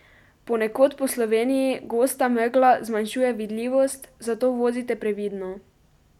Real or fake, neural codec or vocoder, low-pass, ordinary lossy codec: real; none; 19.8 kHz; none